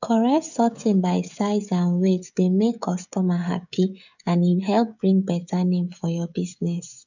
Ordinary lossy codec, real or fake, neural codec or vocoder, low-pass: none; fake; codec, 16 kHz, 16 kbps, FreqCodec, smaller model; 7.2 kHz